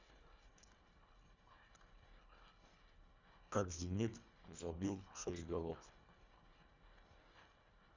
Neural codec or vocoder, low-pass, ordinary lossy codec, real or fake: codec, 24 kHz, 1.5 kbps, HILCodec; 7.2 kHz; none; fake